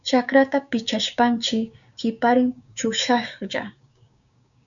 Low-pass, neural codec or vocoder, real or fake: 7.2 kHz; codec, 16 kHz, 6 kbps, DAC; fake